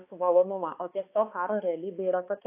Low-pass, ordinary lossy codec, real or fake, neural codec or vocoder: 3.6 kHz; AAC, 24 kbps; fake; codec, 16 kHz, 2 kbps, X-Codec, HuBERT features, trained on balanced general audio